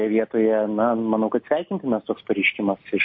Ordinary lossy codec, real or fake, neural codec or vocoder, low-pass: MP3, 32 kbps; real; none; 7.2 kHz